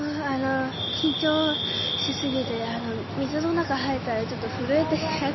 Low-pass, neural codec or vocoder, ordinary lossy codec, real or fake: 7.2 kHz; none; MP3, 24 kbps; real